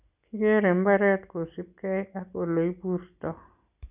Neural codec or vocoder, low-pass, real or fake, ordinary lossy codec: none; 3.6 kHz; real; none